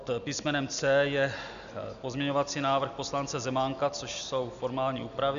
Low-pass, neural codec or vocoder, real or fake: 7.2 kHz; none; real